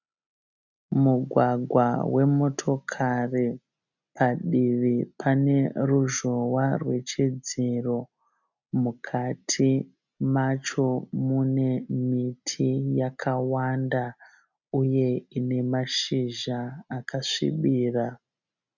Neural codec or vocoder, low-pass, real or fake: none; 7.2 kHz; real